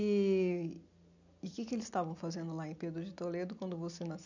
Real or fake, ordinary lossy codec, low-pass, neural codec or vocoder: real; none; 7.2 kHz; none